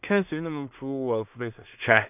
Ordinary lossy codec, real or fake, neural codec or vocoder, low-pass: none; fake; codec, 16 kHz in and 24 kHz out, 0.4 kbps, LongCat-Audio-Codec, two codebook decoder; 3.6 kHz